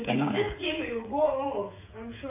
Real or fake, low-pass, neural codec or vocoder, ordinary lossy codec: fake; 3.6 kHz; codec, 16 kHz, 8 kbps, FreqCodec, smaller model; AAC, 32 kbps